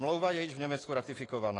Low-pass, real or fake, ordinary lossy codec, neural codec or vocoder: 10.8 kHz; fake; AAC, 32 kbps; autoencoder, 48 kHz, 128 numbers a frame, DAC-VAE, trained on Japanese speech